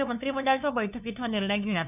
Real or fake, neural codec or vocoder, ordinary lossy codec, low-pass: fake; codec, 16 kHz, 2 kbps, FunCodec, trained on LibriTTS, 25 frames a second; none; 3.6 kHz